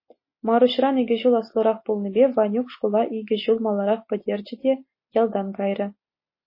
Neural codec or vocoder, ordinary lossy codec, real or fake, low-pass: none; MP3, 24 kbps; real; 5.4 kHz